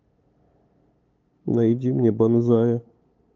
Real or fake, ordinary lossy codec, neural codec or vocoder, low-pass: fake; Opus, 24 kbps; codec, 16 kHz, 8 kbps, FunCodec, trained on LibriTTS, 25 frames a second; 7.2 kHz